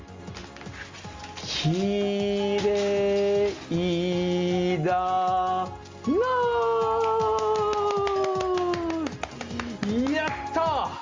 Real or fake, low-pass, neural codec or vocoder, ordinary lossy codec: real; 7.2 kHz; none; Opus, 32 kbps